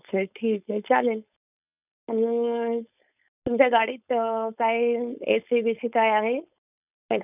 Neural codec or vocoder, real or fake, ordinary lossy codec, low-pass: codec, 16 kHz, 4.8 kbps, FACodec; fake; none; 3.6 kHz